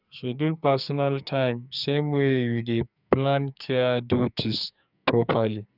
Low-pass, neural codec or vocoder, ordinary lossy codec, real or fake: 5.4 kHz; codec, 44.1 kHz, 2.6 kbps, SNAC; none; fake